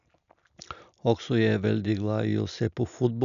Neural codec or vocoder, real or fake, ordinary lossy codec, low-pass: none; real; none; 7.2 kHz